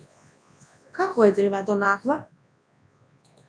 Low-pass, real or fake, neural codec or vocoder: 9.9 kHz; fake; codec, 24 kHz, 0.9 kbps, WavTokenizer, large speech release